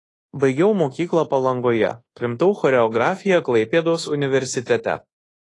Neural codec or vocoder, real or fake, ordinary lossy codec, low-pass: codec, 24 kHz, 1.2 kbps, DualCodec; fake; AAC, 32 kbps; 10.8 kHz